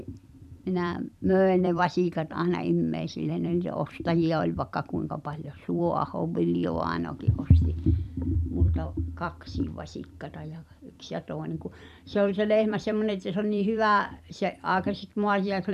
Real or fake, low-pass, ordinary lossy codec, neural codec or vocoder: fake; 14.4 kHz; none; vocoder, 44.1 kHz, 128 mel bands every 256 samples, BigVGAN v2